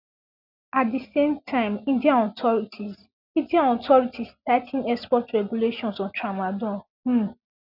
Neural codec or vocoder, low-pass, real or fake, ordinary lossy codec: none; 5.4 kHz; real; none